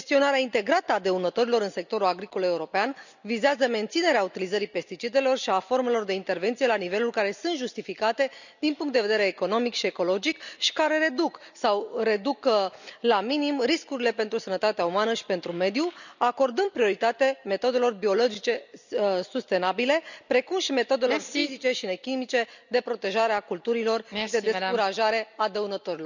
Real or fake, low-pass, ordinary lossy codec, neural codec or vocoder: real; 7.2 kHz; none; none